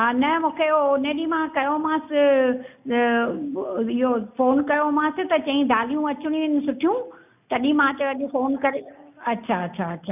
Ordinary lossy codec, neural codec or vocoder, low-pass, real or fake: none; none; 3.6 kHz; real